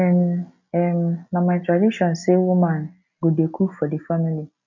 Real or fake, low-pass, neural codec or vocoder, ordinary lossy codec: real; 7.2 kHz; none; none